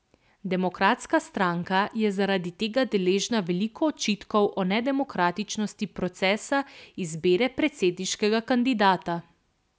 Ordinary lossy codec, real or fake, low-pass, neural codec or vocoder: none; real; none; none